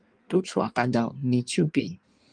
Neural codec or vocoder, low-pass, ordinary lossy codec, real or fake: codec, 16 kHz in and 24 kHz out, 1.1 kbps, FireRedTTS-2 codec; 9.9 kHz; Opus, 32 kbps; fake